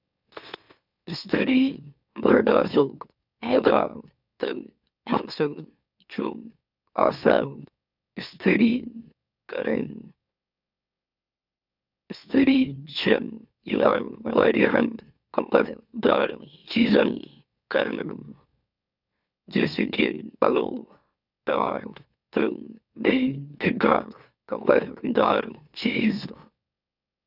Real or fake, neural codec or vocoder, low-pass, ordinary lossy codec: fake; autoencoder, 44.1 kHz, a latent of 192 numbers a frame, MeloTTS; 5.4 kHz; none